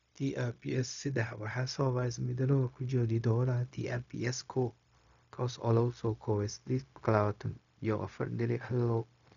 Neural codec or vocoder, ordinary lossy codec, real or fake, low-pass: codec, 16 kHz, 0.4 kbps, LongCat-Audio-Codec; none; fake; 7.2 kHz